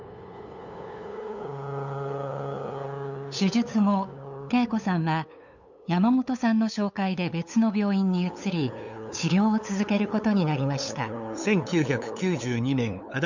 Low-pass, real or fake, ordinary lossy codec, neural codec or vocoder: 7.2 kHz; fake; none; codec, 16 kHz, 8 kbps, FunCodec, trained on LibriTTS, 25 frames a second